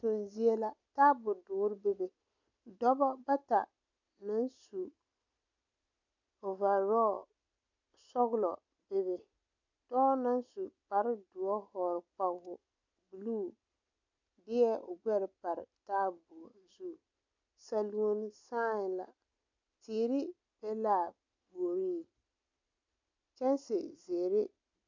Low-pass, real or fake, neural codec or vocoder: 7.2 kHz; real; none